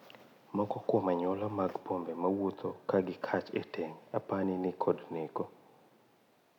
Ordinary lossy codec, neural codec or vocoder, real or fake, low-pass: none; none; real; 19.8 kHz